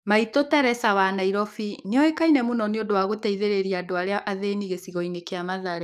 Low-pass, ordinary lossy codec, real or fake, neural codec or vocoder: 14.4 kHz; none; fake; codec, 44.1 kHz, 7.8 kbps, DAC